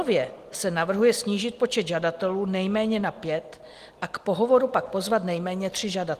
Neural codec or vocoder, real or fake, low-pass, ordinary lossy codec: none; real; 14.4 kHz; Opus, 24 kbps